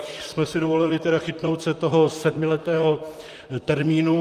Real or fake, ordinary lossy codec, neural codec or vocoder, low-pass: fake; Opus, 32 kbps; vocoder, 44.1 kHz, 128 mel bands, Pupu-Vocoder; 14.4 kHz